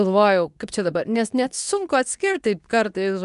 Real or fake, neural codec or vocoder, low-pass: fake; codec, 24 kHz, 0.9 kbps, WavTokenizer, medium speech release version 2; 10.8 kHz